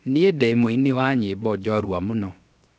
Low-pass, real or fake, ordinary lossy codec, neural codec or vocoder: none; fake; none; codec, 16 kHz, 0.7 kbps, FocalCodec